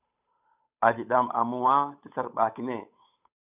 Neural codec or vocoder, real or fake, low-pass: codec, 16 kHz, 8 kbps, FunCodec, trained on Chinese and English, 25 frames a second; fake; 3.6 kHz